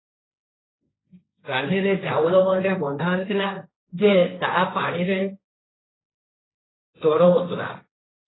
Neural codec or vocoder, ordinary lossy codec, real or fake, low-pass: codec, 16 kHz, 1.1 kbps, Voila-Tokenizer; AAC, 16 kbps; fake; 7.2 kHz